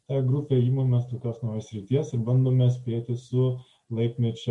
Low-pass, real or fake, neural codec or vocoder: 10.8 kHz; real; none